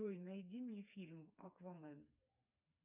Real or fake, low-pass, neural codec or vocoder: fake; 3.6 kHz; codec, 16 kHz, 4 kbps, FreqCodec, smaller model